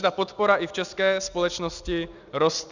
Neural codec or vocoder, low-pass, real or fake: none; 7.2 kHz; real